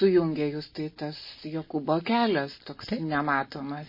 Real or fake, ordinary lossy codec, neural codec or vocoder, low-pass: real; MP3, 24 kbps; none; 5.4 kHz